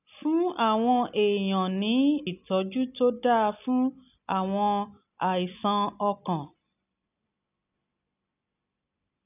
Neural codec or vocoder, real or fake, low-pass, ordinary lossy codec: none; real; 3.6 kHz; none